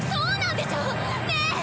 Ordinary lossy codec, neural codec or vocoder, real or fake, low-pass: none; none; real; none